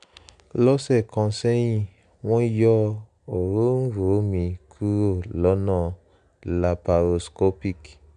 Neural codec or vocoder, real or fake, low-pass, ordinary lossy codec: none; real; 9.9 kHz; none